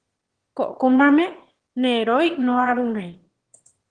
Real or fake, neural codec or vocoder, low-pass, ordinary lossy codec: fake; autoencoder, 22.05 kHz, a latent of 192 numbers a frame, VITS, trained on one speaker; 9.9 kHz; Opus, 16 kbps